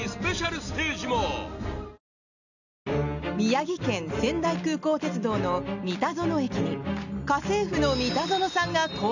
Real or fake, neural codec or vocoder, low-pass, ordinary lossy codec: real; none; 7.2 kHz; none